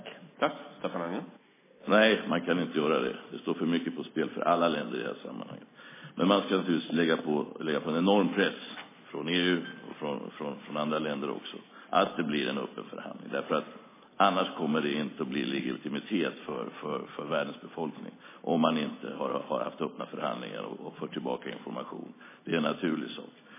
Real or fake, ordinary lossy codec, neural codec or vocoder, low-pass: real; MP3, 16 kbps; none; 3.6 kHz